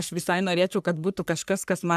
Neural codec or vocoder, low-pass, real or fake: codec, 44.1 kHz, 3.4 kbps, Pupu-Codec; 14.4 kHz; fake